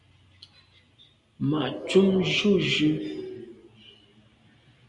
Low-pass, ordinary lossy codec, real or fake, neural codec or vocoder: 10.8 kHz; Opus, 64 kbps; real; none